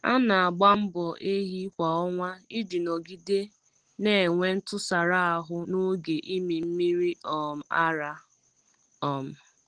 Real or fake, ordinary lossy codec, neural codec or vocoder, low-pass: real; Opus, 16 kbps; none; 9.9 kHz